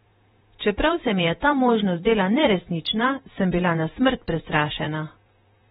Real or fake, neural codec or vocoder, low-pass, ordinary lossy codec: fake; vocoder, 48 kHz, 128 mel bands, Vocos; 19.8 kHz; AAC, 16 kbps